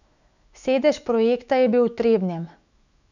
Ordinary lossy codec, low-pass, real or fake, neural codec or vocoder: none; 7.2 kHz; fake; autoencoder, 48 kHz, 128 numbers a frame, DAC-VAE, trained on Japanese speech